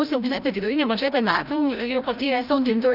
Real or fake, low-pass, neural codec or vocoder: fake; 5.4 kHz; codec, 16 kHz, 0.5 kbps, FreqCodec, larger model